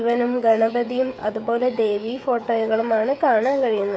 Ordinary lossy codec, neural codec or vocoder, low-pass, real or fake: none; codec, 16 kHz, 16 kbps, FreqCodec, smaller model; none; fake